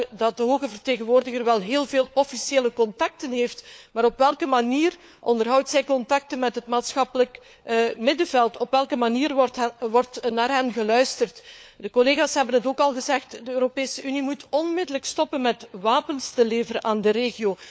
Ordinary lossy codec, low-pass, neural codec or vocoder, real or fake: none; none; codec, 16 kHz, 4 kbps, FunCodec, trained on LibriTTS, 50 frames a second; fake